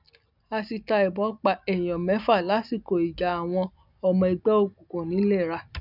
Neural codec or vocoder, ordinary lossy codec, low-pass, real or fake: none; none; 5.4 kHz; real